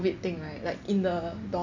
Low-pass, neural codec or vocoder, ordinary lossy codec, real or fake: 7.2 kHz; none; none; real